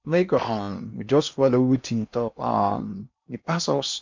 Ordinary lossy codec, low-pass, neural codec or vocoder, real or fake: MP3, 64 kbps; 7.2 kHz; codec, 16 kHz in and 24 kHz out, 0.8 kbps, FocalCodec, streaming, 65536 codes; fake